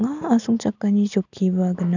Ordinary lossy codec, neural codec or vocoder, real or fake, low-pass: none; none; real; 7.2 kHz